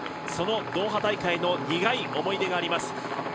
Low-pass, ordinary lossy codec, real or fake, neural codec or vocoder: none; none; real; none